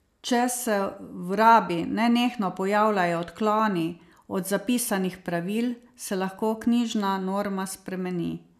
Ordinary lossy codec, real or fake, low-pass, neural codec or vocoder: none; real; 14.4 kHz; none